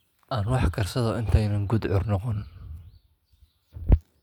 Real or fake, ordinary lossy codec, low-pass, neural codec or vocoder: real; none; 19.8 kHz; none